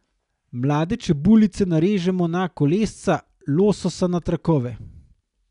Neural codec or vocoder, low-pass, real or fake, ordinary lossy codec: none; 10.8 kHz; real; none